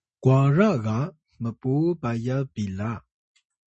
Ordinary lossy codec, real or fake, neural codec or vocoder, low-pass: MP3, 32 kbps; real; none; 10.8 kHz